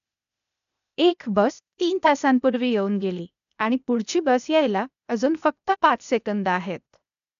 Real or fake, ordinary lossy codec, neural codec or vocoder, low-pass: fake; none; codec, 16 kHz, 0.8 kbps, ZipCodec; 7.2 kHz